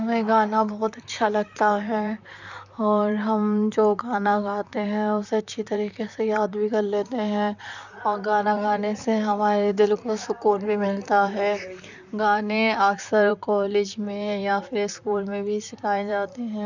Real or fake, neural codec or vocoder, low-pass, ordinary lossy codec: fake; vocoder, 44.1 kHz, 128 mel bands, Pupu-Vocoder; 7.2 kHz; none